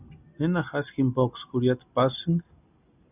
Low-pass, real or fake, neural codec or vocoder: 3.6 kHz; real; none